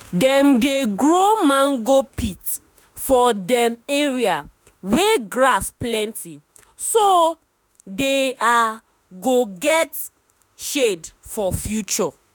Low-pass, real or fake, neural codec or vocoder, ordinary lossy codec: none; fake; autoencoder, 48 kHz, 32 numbers a frame, DAC-VAE, trained on Japanese speech; none